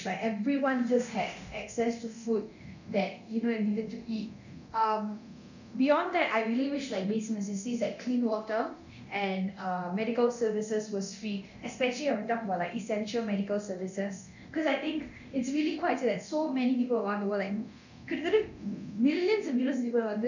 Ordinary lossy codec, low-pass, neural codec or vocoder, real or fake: none; 7.2 kHz; codec, 24 kHz, 0.9 kbps, DualCodec; fake